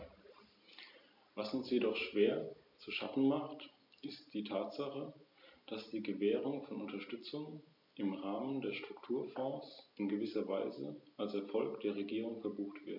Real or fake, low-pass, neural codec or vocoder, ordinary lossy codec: real; 5.4 kHz; none; MP3, 48 kbps